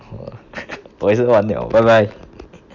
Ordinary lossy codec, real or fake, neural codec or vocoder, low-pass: none; real; none; 7.2 kHz